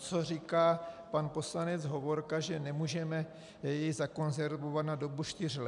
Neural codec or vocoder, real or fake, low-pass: none; real; 10.8 kHz